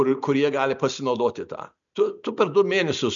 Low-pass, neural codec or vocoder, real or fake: 7.2 kHz; none; real